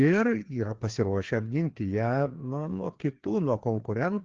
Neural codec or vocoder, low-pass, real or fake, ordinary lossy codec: codec, 16 kHz, 2 kbps, FreqCodec, larger model; 7.2 kHz; fake; Opus, 16 kbps